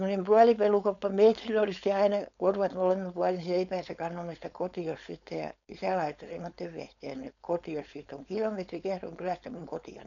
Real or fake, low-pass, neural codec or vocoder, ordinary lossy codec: fake; 7.2 kHz; codec, 16 kHz, 4.8 kbps, FACodec; none